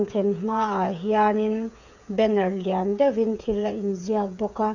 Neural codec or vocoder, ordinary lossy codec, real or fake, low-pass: codec, 16 kHz, 8 kbps, FreqCodec, smaller model; none; fake; 7.2 kHz